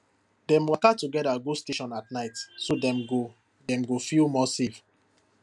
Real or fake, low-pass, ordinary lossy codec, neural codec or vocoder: real; 10.8 kHz; none; none